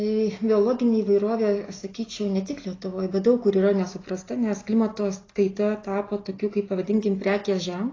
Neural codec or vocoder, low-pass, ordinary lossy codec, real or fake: none; 7.2 kHz; AAC, 32 kbps; real